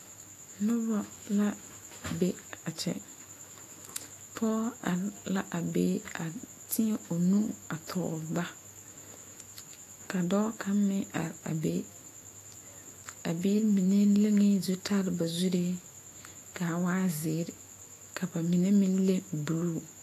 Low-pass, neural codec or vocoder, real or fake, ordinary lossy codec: 14.4 kHz; autoencoder, 48 kHz, 128 numbers a frame, DAC-VAE, trained on Japanese speech; fake; AAC, 48 kbps